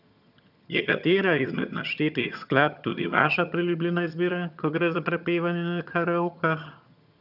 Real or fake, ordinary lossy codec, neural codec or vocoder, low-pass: fake; none; vocoder, 22.05 kHz, 80 mel bands, HiFi-GAN; 5.4 kHz